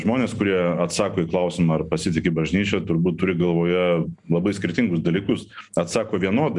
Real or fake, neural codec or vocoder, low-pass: real; none; 10.8 kHz